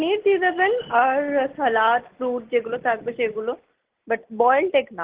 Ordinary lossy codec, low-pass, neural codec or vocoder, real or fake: Opus, 16 kbps; 3.6 kHz; none; real